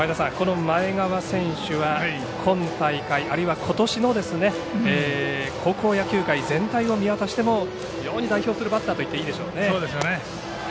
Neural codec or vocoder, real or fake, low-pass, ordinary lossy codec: none; real; none; none